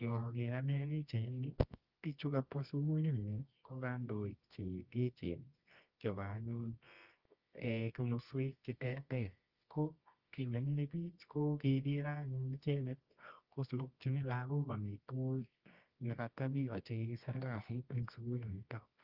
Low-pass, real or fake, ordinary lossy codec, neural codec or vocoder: 5.4 kHz; fake; Opus, 24 kbps; codec, 24 kHz, 0.9 kbps, WavTokenizer, medium music audio release